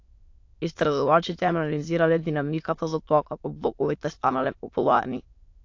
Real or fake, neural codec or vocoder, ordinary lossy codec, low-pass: fake; autoencoder, 22.05 kHz, a latent of 192 numbers a frame, VITS, trained on many speakers; AAC, 48 kbps; 7.2 kHz